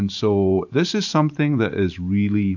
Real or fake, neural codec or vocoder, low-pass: fake; vocoder, 44.1 kHz, 128 mel bands every 512 samples, BigVGAN v2; 7.2 kHz